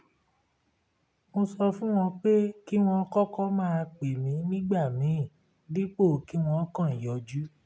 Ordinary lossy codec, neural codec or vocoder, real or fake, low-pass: none; none; real; none